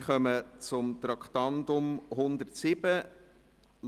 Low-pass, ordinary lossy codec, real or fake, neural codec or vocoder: 14.4 kHz; Opus, 24 kbps; real; none